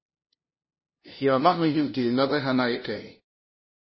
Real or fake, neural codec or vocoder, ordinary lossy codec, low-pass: fake; codec, 16 kHz, 0.5 kbps, FunCodec, trained on LibriTTS, 25 frames a second; MP3, 24 kbps; 7.2 kHz